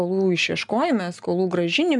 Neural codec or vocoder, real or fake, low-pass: none; real; 10.8 kHz